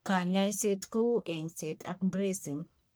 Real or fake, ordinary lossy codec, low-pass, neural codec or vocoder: fake; none; none; codec, 44.1 kHz, 1.7 kbps, Pupu-Codec